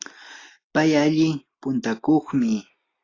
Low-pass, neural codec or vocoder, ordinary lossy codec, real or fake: 7.2 kHz; none; AAC, 32 kbps; real